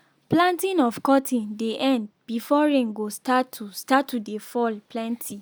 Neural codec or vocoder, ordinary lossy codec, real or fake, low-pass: none; none; real; none